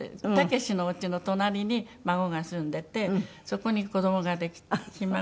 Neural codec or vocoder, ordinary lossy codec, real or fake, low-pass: none; none; real; none